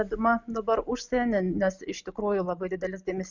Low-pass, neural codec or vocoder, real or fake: 7.2 kHz; none; real